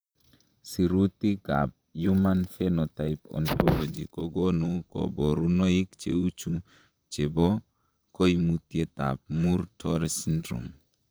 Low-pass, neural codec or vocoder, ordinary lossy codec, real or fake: none; vocoder, 44.1 kHz, 128 mel bands, Pupu-Vocoder; none; fake